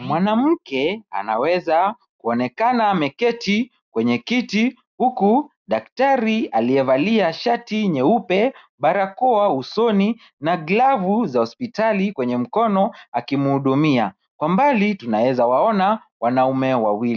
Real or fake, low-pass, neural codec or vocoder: real; 7.2 kHz; none